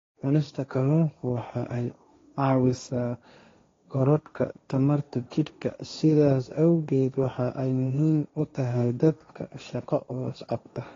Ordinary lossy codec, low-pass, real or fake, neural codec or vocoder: AAC, 32 kbps; 7.2 kHz; fake; codec, 16 kHz, 1.1 kbps, Voila-Tokenizer